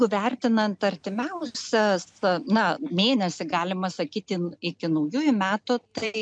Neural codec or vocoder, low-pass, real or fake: none; 9.9 kHz; real